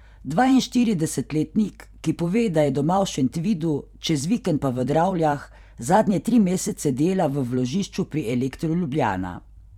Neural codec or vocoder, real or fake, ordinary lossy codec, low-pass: vocoder, 44.1 kHz, 128 mel bands every 512 samples, BigVGAN v2; fake; none; 19.8 kHz